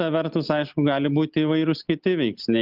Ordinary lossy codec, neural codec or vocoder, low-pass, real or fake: Opus, 24 kbps; none; 5.4 kHz; real